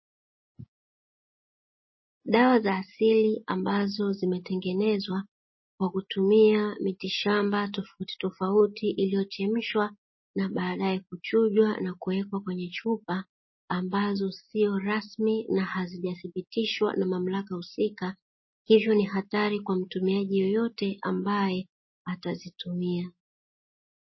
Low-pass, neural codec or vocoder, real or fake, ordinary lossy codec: 7.2 kHz; none; real; MP3, 24 kbps